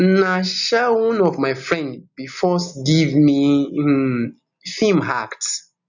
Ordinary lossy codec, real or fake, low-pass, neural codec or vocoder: none; real; 7.2 kHz; none